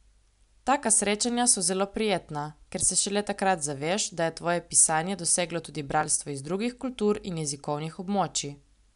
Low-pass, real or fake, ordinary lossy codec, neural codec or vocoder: 10.8 kHz; real; none; none